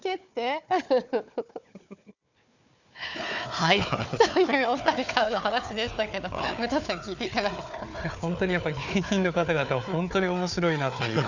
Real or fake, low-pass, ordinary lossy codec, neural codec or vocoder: fake; 7.2 kHz; none; codec, 16 kHz, 4 kbps, FunCodec, trained on Chinese and English, 50 frames a second